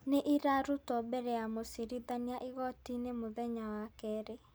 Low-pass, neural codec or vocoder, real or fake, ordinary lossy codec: none; none; real; none